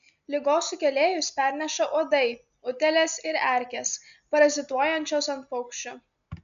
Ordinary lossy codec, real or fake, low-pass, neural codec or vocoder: MP3, 96 kbps; real; 7.2 kHz; none